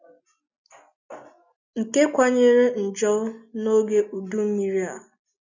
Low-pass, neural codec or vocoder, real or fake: 7.2 kHz; none; real